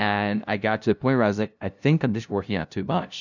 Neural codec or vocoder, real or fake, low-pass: codec, 16 kHz, 0.5 kbps, FunCodec, trained on LibriTTS, 25 frames a second; fake; 7.2 kHz